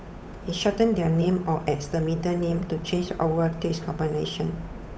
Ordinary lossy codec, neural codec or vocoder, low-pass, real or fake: none; codec, 16 kHz, 8 kbps, FunCodec, trained on Chinese and English, 25 frames a second; none; fake